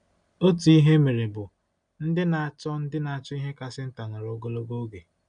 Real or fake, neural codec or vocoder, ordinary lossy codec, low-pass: real; none; none; 9.9 kHz